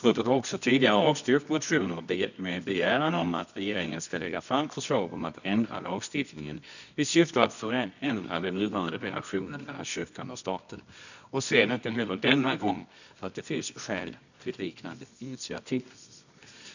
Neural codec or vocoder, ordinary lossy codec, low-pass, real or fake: codec, 24 kHz, 0.9 kbps, WavTokenizer, medium music audio release; none; 7.2 kHz; fake